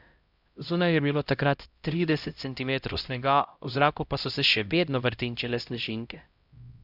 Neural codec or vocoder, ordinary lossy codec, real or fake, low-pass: codec, 16 kHz, 0.5 kbps, X-Codec, HuBERT features, trained on LibriSpeech; Opus, 64 kbps; fake; 5.4 kHz